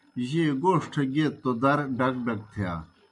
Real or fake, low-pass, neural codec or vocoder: real; 10.8 kHz; none